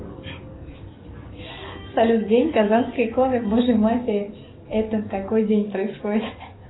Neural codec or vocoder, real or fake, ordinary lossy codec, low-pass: codec, 44.1 kHz, 7.8 kbps, DAC; fake; AAC, 16 kbps; 7.2 kHz